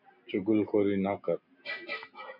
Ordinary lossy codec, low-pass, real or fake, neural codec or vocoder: MP3, 48 kbps; 5.4 kHz; real; none